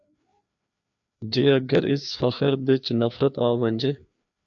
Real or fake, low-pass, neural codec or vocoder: fake; 7.2 kHz; codec, 16 kHz, 2 kbps, FreqCodec, larger model